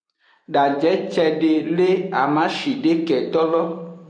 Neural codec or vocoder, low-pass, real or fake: vocoder, 44.1 kHz, 128 mel bands every 256 samples, BigVGAN v2; 9.9 kHz; fake